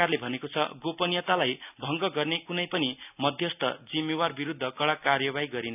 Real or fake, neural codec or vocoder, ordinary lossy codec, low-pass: real; none; none; 3.6 kHz